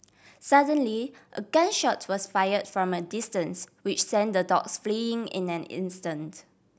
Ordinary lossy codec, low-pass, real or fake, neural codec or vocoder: none; none; real; none